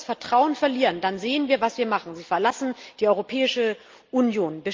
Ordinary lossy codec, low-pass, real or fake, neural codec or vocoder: Opus, 32 kbps; 7.2 kHz; real; none